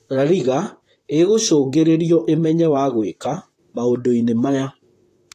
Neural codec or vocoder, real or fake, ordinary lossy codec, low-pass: vocoder, 44.1 kHz, 128 mel bands, Pupu-Vocoder; fake; AAC, 48 kbps; 14.4 kHz